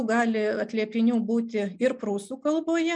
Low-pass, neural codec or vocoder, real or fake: 10.8 kHz; none; real